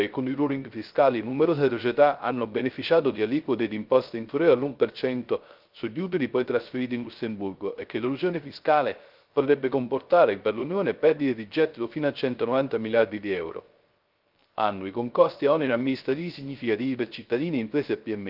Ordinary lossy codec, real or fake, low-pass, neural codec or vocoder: Opus, 32 kbps; fake; 5.4 kHz; codec, 16 kHz, 0.3 kbps, FocalCodec